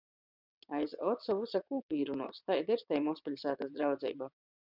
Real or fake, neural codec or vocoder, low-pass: fake; vocoder, 22.05 kHz, 80 mel bands, WaveNeXt; 5.4 kHz